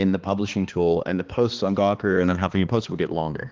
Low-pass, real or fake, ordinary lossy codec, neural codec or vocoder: 7.2 kHz; fake; Opus, 16 kbps; codec, 16 kHz, 2 kbps, X-Codec, HuBERT features, trained on balanced general audio